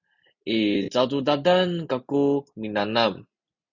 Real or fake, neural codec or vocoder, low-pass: real; none; 7.2 kHz